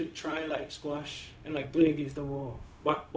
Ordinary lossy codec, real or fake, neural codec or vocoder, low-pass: none; fake; codec, 16 kHz, 0.4 kbps, LongCat-Audio-Codec; none